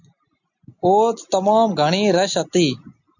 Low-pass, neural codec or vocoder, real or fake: 7.2 kHz; none; real